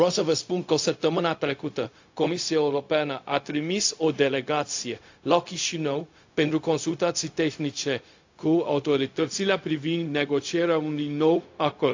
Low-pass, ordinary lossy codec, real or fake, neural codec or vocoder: 7.2 kHz; AAC, 48 kbps; fake; codec, 16 kHz, 0.4 kbps, LongCat-Audio-Codec